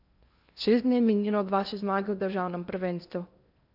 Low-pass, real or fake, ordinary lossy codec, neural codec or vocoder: 5.4 kHz; fake; none; codec, 16 kHz in and 24 kHz out, 0.8 kbps, FocalCodec, streaming, 65536 codes